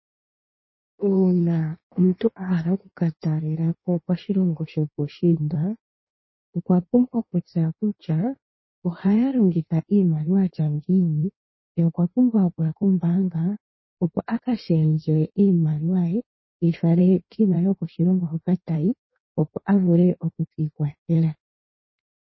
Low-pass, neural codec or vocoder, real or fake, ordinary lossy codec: 7.2 kHz; codec, 16 kHz in and 24 kHz out, 1.1 kbps, FireRedTTS-2 codec; fake; MP3, 24 kbps